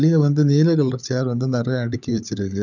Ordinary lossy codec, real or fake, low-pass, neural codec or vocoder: none; fake; 7.2 kHz; codec, 16 kHz, 16 kbps, FunCodec, trained on LibriTTS, 50 frames a second